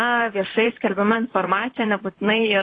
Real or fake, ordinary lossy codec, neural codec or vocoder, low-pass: fake; AAC, 32 kbps; vocoder, 44.1 kHz, 128 mel bands, Pupu-Vocoder; 9.9 kHz